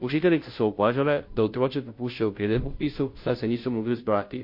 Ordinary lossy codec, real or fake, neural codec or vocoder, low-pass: MP3, 32 kbps; fake; codec, 16 kHz, 0.5 kbps, FunCodec, trained on Chinese and English, 25 frames a second; 5.4 kHz